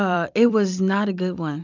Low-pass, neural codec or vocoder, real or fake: 7.2 kHz; vocoder, 44.1 kHz, 128 mel bands every 256 samples, BigVGAN v2; fake